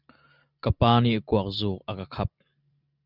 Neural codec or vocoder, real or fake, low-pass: none; real; 5.4 kHz